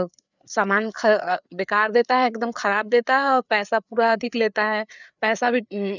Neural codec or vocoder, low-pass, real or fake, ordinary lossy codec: codec, 16 kHz, 4 kbps, FreqCodec, larger model; 7.2 kHz; fake; none